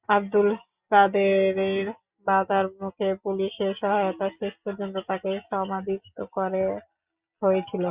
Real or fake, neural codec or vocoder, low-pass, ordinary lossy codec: real; none; 3.6 kHz; Opus, 64 kbps